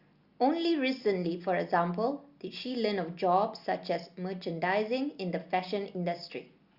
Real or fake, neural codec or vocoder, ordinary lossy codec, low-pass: real; none; Opus, 64 kbps; 5.4 kHz